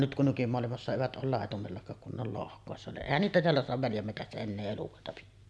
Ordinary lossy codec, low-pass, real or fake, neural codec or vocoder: none; none; real; none